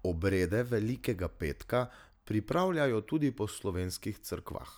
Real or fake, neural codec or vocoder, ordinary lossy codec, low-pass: real; none; none; none